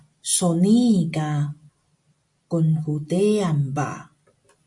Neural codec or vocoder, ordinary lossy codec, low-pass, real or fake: none; MP3, 48 kbps; 10.8 kHz; real